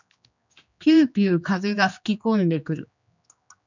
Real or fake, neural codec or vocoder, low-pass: fake; codec, 16 kHz, 2 kbps, X-Codec, HuBERT features, trained on general audio; 7.2 kHz